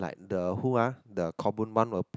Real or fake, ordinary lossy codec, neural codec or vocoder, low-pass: real; none; none; none